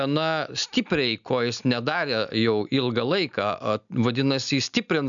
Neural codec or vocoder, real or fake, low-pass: none; real; 7.2 kHz